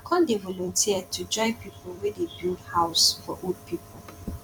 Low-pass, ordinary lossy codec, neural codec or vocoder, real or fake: 19.8 kHz; none; vocoder, 44.1 kHz, 128 mel bands every 256 samples, BigVGAN v2; fake